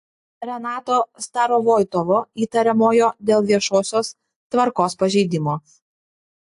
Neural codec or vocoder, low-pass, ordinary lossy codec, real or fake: none; 10.8 kHz; AAC, 64 kbps; real